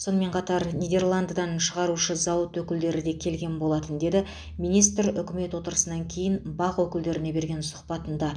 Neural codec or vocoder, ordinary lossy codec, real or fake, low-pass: none; none; real; 9.9 kHz